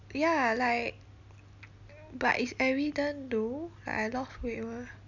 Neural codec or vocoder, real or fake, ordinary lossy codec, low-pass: none; real; none; 7.2 kHz